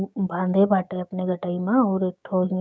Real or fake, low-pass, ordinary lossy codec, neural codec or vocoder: fake; none; none; codec, 16 kHz, 6 kbps, DAC